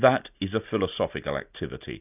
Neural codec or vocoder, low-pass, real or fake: none; 3.6 kHz; real